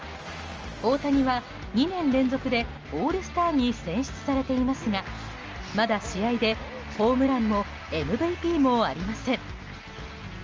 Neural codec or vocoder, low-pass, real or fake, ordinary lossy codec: none; 7.2 kHz; real; Opus, 24 kbps